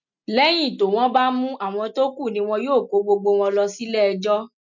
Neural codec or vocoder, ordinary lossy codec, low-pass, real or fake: none; none; 7.2 kHz; real